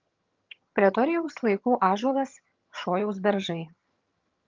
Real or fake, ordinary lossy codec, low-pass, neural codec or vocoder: fake; Opus, 24 kbps; 7.2 kHz; vocoder, 22.05 kHz, 80 mel bands, HiFi-GAN